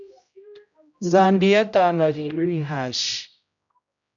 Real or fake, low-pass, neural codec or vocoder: fake; 7.2 kHz; codec, 16 kHz, 0.5 kbps, X-Codec, HuBERT features, trained on general audio